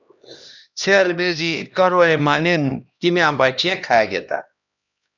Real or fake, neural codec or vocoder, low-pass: fake; codec, 16 kHz, 1 kbps, X-Codec, HuBERT features, trained on LibriSpeech; 7.2 kHz